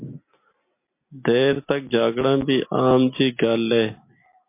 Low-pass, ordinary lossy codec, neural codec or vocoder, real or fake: 3.6 kHz; MP3, 24 kbps; none; real